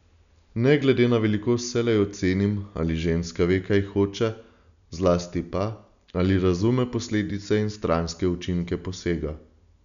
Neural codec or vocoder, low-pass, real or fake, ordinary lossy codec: none; 7.2 kHz; real; none